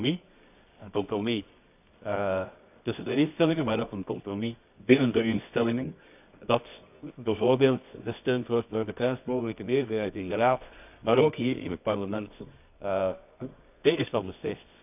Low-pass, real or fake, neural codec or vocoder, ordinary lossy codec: 3.6 kHz; fake; codec, 24 kHz, 0.9 kbps, WavTokenizer, medium music audio release; none